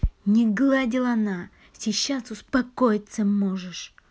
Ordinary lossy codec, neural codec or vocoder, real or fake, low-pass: none; none; real; none